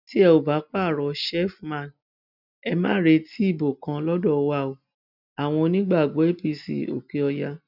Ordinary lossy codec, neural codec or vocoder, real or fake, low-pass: none; none; real; 5.4 kHz